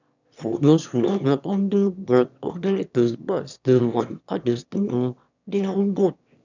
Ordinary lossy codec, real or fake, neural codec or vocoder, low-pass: none; fake; autoencoder, 22.05 kHz, a latent of 192 numbers a frame, VITS, trained on one speaker; 7.2 kHz